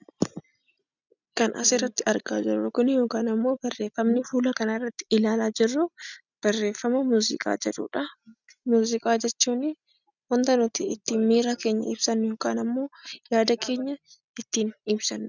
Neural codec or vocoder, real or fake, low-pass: none; real; 7.2 kHz